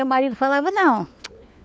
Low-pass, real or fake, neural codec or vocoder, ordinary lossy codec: none; fake; codec, 16 kHz, 4 kbps, FunCodec, trained on LibriTTS, 50 frames a second; none